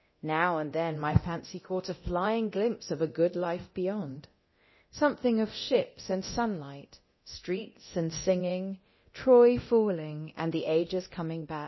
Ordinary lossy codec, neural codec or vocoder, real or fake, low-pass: MP3, 24 kbps; codec, 24 kHz, 0.9 kbps, DualCodec; fake; 7.2 kHz